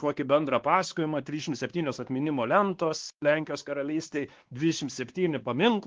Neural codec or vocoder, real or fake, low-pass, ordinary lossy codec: codec, 16 kHz, 2 kbps, X-Codec, WavLM features, trained on Multilingual LibriSpeech; fake; 7.2 kHz; Opus, 16 kbps